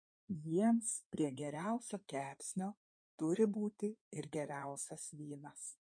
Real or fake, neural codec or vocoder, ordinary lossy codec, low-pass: fake; codec, 16 kHz in and 24 kHz out, 2.2 kbps, FireRedTTS-2 codec; MP3, 48 kbps; 9.9 kHz